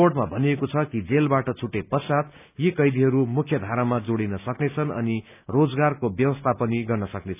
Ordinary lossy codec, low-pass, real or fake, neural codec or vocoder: none; 3.6 kHz; real; none